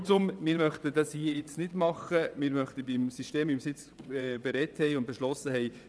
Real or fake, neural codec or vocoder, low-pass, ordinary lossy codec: fake; vocoder, 22.05 kHz, 80 mel bands, WaveNeXt; none; none